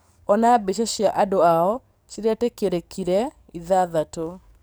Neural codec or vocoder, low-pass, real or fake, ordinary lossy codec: codec, 44.1 kHz, 7.8 kbps, DAC; none; fake; none